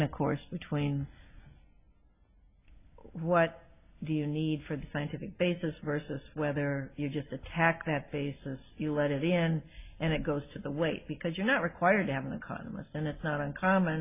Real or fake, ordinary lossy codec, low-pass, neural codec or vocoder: real; AAC, 32 kbps; 3.6 kHz; none